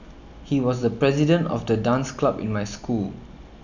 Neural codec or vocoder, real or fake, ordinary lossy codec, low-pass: none; real; none; 7.2 kHz